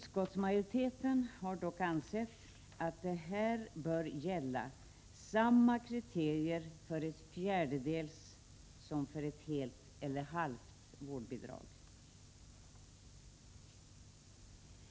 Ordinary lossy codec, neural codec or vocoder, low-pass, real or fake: none; none; none; real